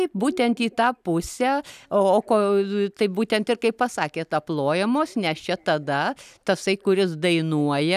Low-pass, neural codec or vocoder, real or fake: 14.4 kHz; none; real